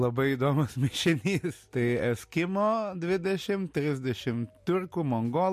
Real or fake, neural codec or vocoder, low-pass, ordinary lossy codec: real; none; 14.4 kHz; MP3, 64 kbps